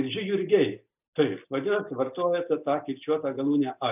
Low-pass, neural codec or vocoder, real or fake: 3.6 kHz; none; real